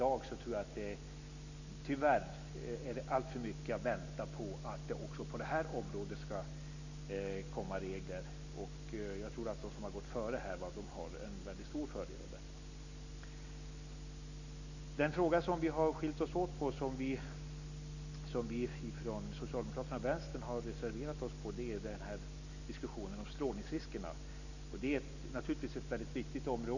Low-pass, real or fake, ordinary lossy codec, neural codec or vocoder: 7.2 kHz; real; none; none